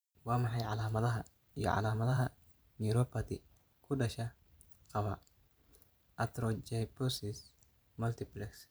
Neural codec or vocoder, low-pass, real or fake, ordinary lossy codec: vocoder, 44.1 kHz, 128 mel bands every 512 samples, BigVGAN v2; none; fake; none